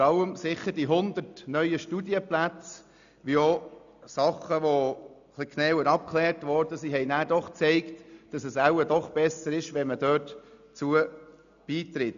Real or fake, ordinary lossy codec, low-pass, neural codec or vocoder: real; none; 7.2 kHz; none